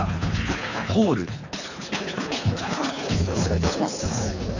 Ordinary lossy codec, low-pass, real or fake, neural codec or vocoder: none; 7.2 kHz; fake; codec, 24 kHz, 3 kbps, HILCodec